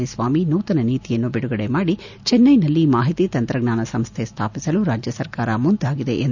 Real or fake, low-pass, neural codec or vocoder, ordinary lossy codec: real; 7.2 kHz; none; none